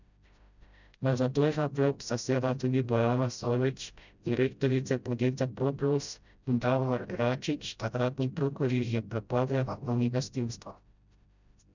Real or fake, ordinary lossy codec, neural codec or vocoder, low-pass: fake; none; codec, 16 kHz, 0.5 kbps, FreqCodec, smaller model; 7.2 kHz